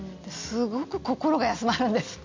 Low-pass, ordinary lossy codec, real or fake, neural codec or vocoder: 7.2 kHz; MP3, 48 kbps; real; none